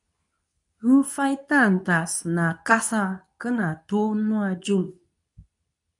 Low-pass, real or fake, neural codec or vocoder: 10.8 kHz; fake; codec, 24 kHz, 0.9 kbps, WavTokenizer, medium speech release version 2